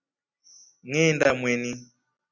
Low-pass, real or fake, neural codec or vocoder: 7.2 kHz; real; none